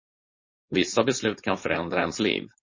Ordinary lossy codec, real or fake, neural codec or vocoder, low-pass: MP3, 32 kbps; fake; codec, 16 kHz, 4.8 kbps, FACodec; 7.2 kHz